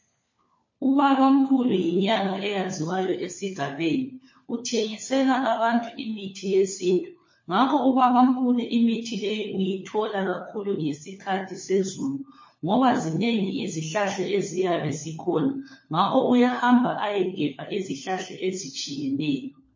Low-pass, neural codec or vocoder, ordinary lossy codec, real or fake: 7.2 kHz; codec, 16 kHz, 4 kbps, FunCodec, trained on LibriTTS, 50 frames a second; MP3, 32 kbps; fake